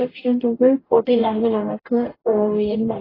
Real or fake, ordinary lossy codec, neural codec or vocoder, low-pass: fake; AAC, 24 kbps; codec, 44.1 kHz, 0.9 kbps, DAC; 5.4 kHz